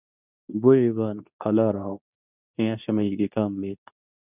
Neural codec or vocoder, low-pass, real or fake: codec, 24 kHz, 0.9 kbps, WavTokenizer, medium speech release version 2; 3.6 kHz; fake